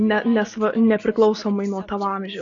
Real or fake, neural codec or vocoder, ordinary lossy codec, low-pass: real; none; AAC, 48 kbps; 7.2 kHz